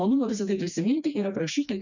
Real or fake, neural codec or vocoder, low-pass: fake; codec, 16 kHz, 2 kbps, FreqCodec, smaller model; 7.2 kHz